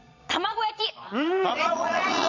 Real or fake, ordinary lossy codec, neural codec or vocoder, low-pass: fake; none; vocoder, 22.05 kHz, 80 mel bands, Vocos; 7.2 kHz